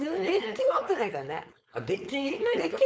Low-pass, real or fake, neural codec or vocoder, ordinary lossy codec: none; fake; codec, 16 kHz, 4.8 kbps, FACodec; none